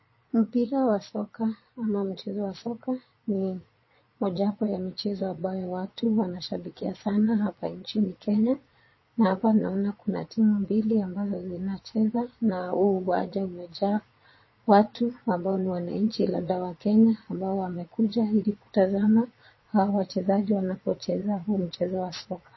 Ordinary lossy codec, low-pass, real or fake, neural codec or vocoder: MP3, 24 kbps; 7.2 kHz; fake; vocoder, 22.05 kHz, 80 mel bands, Vocos